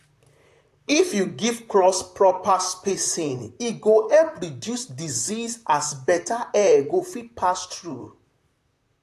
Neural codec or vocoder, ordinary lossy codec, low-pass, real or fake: vocoder, 44.1 kHz, 128 mel bands, Pupu-Vocoder; AAC, 64 kbps; 14.4 kHz; fake